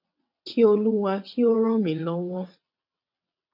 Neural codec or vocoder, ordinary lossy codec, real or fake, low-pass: vocoder, 22.05 kHz, 80 mel bands, WaveNeXt; MP3, 48 kbps; fake; 5.4 kHz